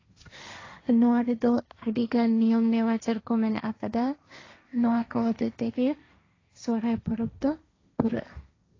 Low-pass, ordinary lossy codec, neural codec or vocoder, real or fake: 7.2 kHz; AAC, 32 kbps; codec, 16 kHz, 1.1 kbps, Voila-Tokenizer; fake